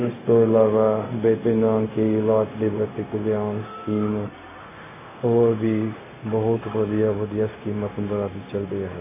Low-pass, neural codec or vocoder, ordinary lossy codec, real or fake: 3.6 kHz; codec, 16 kHz, 0.4 kbps, LongCat-Audio-Codec; MP3, 16 kbps; fake